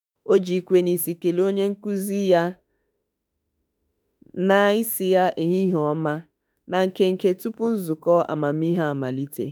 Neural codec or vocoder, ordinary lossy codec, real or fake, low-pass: autoencoder, 48 kHz, 32 numbers a frame, DAC-VAE, trained on Japanese speech; none; fake; none